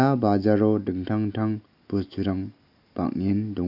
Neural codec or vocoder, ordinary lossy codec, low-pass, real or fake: none; none; 5.4 kHz; real